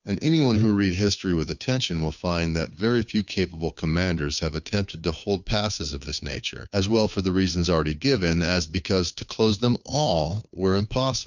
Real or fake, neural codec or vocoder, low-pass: fake; codec, 16 kHz, 2 kbps, FunCodec, trained on Chinese and English, 25 frames a second; 7.2 kHz